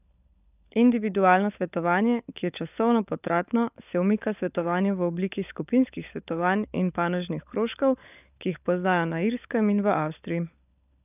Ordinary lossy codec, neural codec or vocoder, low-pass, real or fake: none; codec, 16 kHz, 16 kbps, FunCodec, trained on LibriTTS, 50 frames a second; 3.6 kHz; fake